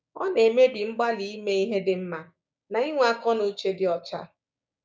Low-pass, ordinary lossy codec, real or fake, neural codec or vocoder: none; none; fake; codec, 16 kHz, 6 kbps, DAC